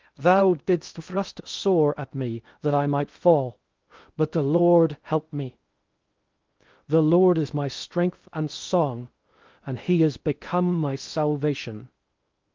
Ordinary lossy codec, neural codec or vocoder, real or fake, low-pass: Opus, 32 kbps; codec, 16 kHz in and 24 kHz out, 0.6 kbps, FocalCodec, streaming, 2048 codes; fake; 7.2 kHz